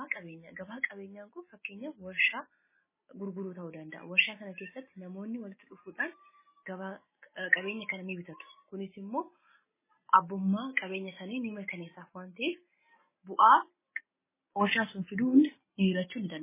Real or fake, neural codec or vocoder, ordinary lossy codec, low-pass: real; none; MP3, 16 kbps; 3.6 kHz